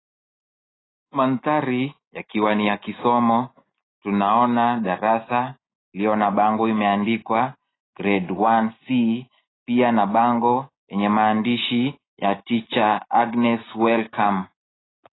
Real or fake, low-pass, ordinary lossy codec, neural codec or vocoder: real; 7.2 kHz; AAC, 16 kbps; none